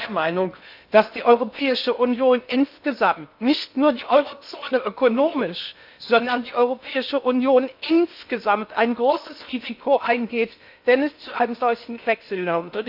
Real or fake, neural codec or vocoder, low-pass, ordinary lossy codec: fake; codec, 16 kHz in and 24 kHz out, 0.6 kbps, FocalCodec, streaming, 2048 codes; 5.4 kHz; AAC, 48 kbps